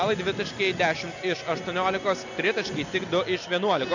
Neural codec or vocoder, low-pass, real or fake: none; 7.2 kHz; real